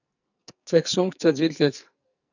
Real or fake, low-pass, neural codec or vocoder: fake; 7.2 kHz; codec, 44.1 kHz, 2.6 kbps, SNAC